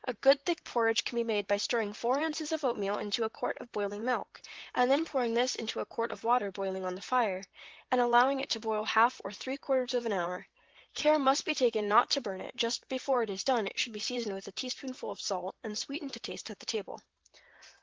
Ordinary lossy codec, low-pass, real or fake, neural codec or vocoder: Opus, 16 kbps; 7.2 kHz; fake; vocoder, 44.1 kHz, 128 mel bands, Pupu-Vocoder